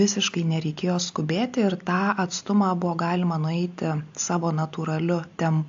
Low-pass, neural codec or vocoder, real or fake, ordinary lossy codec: 7.2 kHz; none; real; AAC, 64 kbps